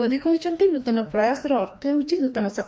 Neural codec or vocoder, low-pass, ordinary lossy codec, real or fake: codec, 16 kHz, 1 kbps, FreqCodec, larger model; none; none; fake